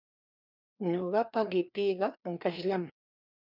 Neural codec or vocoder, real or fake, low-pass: codec, 16 kHz, 2 kbps, FreqCodec, larger model; fake; 5.4 kHz